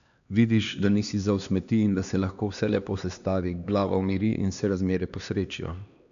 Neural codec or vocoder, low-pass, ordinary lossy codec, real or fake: codec, 16 kHz, 2 kbps, X-Codec, HuBERT features, trained on LibriSpeech; 7.2 kHz; none; fake